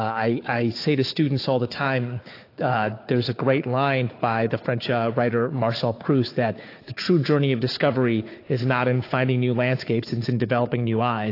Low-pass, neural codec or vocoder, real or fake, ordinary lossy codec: 5.4 kHz; codec, 16 kHz, 4 kbps, FunCodec, trained on Chinese and English, 50 frames a second; fake; AAC, 32 kbps